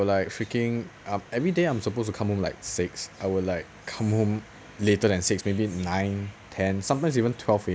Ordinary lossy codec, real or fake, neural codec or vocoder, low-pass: none; real; none; none